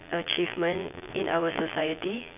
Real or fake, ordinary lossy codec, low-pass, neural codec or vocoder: fake; none; 3.6 kHz; vocoder, 22.05 kHz, 80 mel bands, Vocos